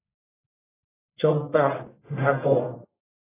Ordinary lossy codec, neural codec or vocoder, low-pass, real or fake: AAC, 16 kbps; codec, 44.1 kHz, 1.7 kbps, Pupu-Codec; 3.6 kHz; fake